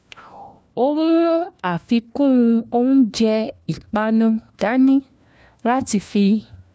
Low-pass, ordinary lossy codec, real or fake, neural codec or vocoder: none; none; fake; codec, 16 kHz, 1 kbps, FunCodec, trained on LibriTTS, 50 frames a second